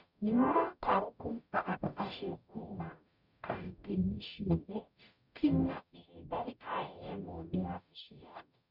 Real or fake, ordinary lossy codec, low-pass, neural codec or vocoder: fake; none; 5.4 kHz; codec, 44.1 kHz, 0.9 kbps, DAC